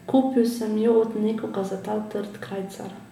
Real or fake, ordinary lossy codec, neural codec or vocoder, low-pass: real; MP3, 96 kbps; none; 19.8 kHz